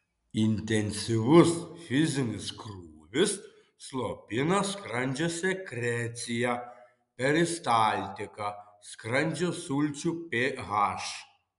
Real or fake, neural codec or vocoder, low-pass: real; none; 10.8 kHz